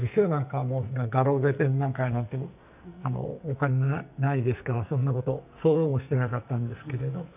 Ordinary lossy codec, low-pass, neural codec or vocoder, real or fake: none; 3.6 kHz; codec, 44.1 kHz, 2.6 kbps, SNAC; fake